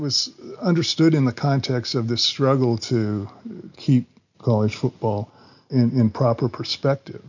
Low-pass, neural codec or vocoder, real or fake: 7.2 kHz; none; real